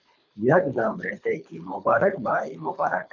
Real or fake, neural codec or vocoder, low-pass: fake; codec, 24 kHz, 3 kbps, HILCodec; 7.2 kHz